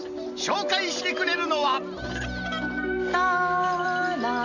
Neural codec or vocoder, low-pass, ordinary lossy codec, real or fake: none; 7.2 kHz; none; real